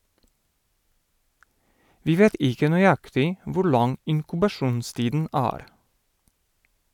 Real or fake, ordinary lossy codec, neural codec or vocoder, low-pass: real; none; none; 19.8 kHz